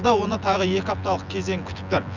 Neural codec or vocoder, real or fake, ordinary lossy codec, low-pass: vocoder, 24 kHz, 100 mel bands, Vocos; fake; none; 7.2 kHz